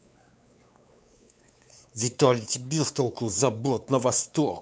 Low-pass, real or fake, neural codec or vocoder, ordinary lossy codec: none; fake; codec, 16 kHz, 4 kbps, X-Codec, WavLM features, trained on Multilingual LibriSpeech; none